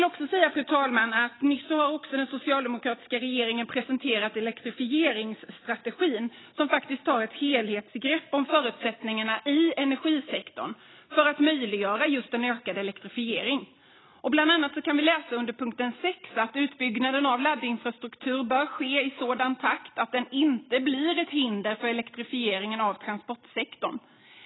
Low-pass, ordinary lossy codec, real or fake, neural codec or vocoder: 7.2 kHz; AAC, 16 kbps; real; none